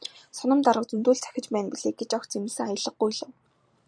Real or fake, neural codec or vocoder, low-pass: fake; vocoder, 44.1 kHz, 128 mel bands every 256 samples, BigVGAN v2; 9.9 kHz